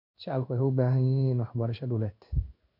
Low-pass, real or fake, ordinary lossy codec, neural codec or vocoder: 5.4 kHz; fake; none; codec, 16 kHz in and 24 kHz out, 1 kbps, XY-Tokenizer